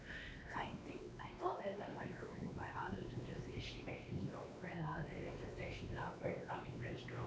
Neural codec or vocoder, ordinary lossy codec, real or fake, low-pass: codec, 16 kHz, 2 kbps, X-Codec, WavLM features, trained on Multilingual LibriSpeech; none; fake; none